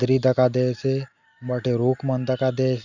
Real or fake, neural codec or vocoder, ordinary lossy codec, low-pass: real; none; Opus, 64 kbps; 7.2 kHz